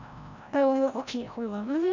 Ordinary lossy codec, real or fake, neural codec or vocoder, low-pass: none; fake; codec, 16 kHz, 0.5 kbps, FreqCodec, larger model; 7.2 kHz